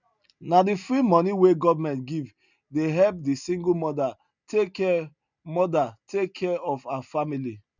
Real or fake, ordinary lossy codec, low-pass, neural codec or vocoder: real; none; 7.2 kHz; none